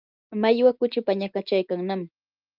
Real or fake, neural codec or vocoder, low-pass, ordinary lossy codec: real; none; 5.4 kHz; Opus, 32 kbps